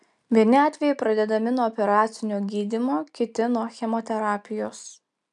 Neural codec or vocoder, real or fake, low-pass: none; real; 10.8 kHz